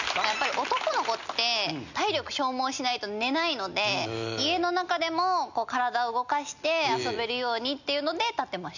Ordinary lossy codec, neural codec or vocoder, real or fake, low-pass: none; none; real; 7.2 kHz